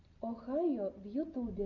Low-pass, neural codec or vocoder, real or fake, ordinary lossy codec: 7.2 kHz; none; real; AAC, 48 kbps